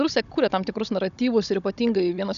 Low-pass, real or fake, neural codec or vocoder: 7.2 kHz; fake; codec, 16 kHz, 16 kbps, FunCodec, trained on Chinese and English, 50 frames a second